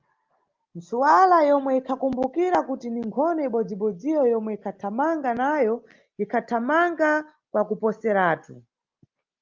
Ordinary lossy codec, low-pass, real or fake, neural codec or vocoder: Opus, 32 kbps; 7.2 kHz; real; none